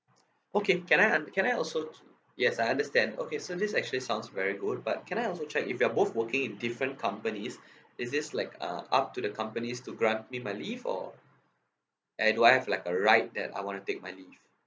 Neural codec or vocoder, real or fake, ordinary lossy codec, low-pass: none; real; none; none